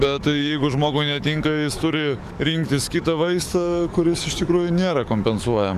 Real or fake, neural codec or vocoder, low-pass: fake; codec, 44.1 kHz, 7.8 kbps, DAC; 14.4 kHz